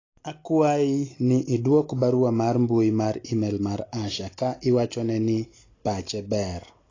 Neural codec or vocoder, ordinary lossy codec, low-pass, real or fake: none; AAC, 32 kbps; 7.2 kHz; real